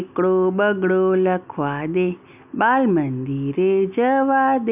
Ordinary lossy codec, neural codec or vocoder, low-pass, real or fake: none; none; 3.6 kHz; real